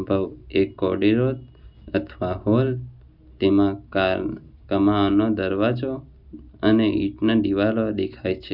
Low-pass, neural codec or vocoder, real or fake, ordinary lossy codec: 5.4 kHz; none; real; none